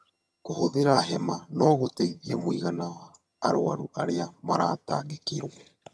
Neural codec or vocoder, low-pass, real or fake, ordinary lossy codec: vocoder, 22.05 kHz, 80 mel bands, HiFi-GAN; none; fake; none